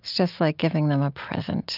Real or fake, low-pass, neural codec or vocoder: real; 5.4 kHz; none